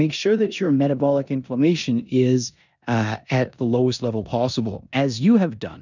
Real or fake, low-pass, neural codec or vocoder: fake; 7.2 kHz; codec, 16 kHz in and 24 kHz out, 0.9 kbps, LongCat-Audio-Codec, four codebook decoder